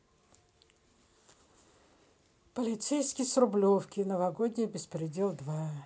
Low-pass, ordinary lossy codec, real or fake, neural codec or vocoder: none; none; real; none